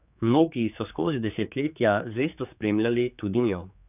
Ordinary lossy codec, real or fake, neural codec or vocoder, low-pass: none; fake; codec, 16 kHz, 4 kbps, X-Codec, HuBERT features, trained on general audio; 3.6 kHz